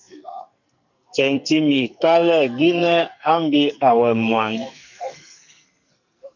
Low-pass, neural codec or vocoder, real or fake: 7.2 kHz; codec, 44.1 kHz, 2.6 kbps, SNAC; fake